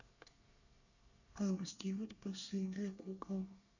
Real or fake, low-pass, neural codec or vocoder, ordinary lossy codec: fake; 7.2 kHz; codec, 24 kHz, 1 kbps, SNAC; none